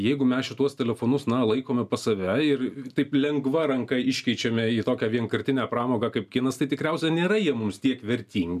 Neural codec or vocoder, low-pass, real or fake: none; 14.4 kHz; real